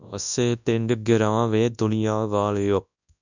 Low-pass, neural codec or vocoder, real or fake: 7.2 kHz; codec, 24 kHz, 0.9 kbps, WavTokenizer, large speech release; fake